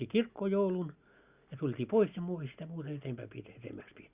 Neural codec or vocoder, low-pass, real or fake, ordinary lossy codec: none; 3.6 kHz; real; Opus, 64 kbps